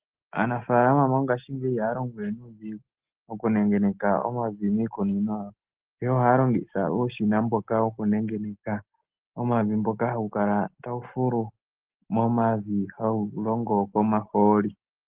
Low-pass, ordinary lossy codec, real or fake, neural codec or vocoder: 3.6 kHz; Opus, 16 kbps; real; none